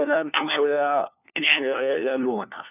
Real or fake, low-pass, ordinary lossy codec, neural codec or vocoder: fake; 3.6 kHz; none; codec, 16 kHz, 1 kbps, FunCodec, trained on LibriTTS, 50 frames a second